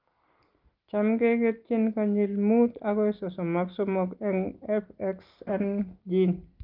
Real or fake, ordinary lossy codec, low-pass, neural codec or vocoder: real; Opus, 32 kbps; 5.4 kHz; none